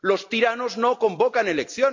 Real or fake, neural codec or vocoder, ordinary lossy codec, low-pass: real; none; MP3, 48 kbps; 7.2 kHz